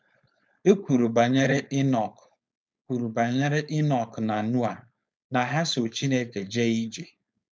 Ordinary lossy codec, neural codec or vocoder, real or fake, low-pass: none; codec, 16 kHz, 4.8 kbps, FACodec; fake; none